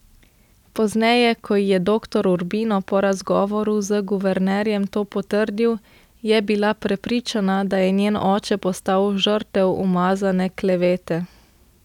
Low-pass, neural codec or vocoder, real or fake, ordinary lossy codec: 19.8 kHz; none; real; none